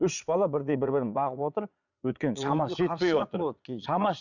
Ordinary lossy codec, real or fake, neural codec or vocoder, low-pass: none; fake; vocoder, 22.05 kHz, 80 mel bands, Vocos; 7.2 kHz